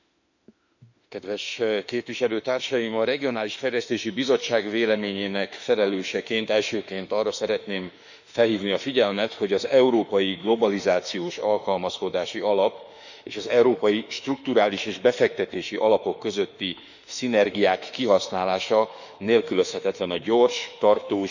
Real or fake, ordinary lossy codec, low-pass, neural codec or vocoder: fake; none; 7.2 kHz; autoencoder, 48 kHz, 32 numbers a frame, DAC-VAE, trained on Japanese speech